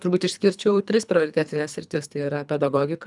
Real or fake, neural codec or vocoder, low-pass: fake; codec, 24 kHz, 3 kbps, HILCodec; 10.8 kHz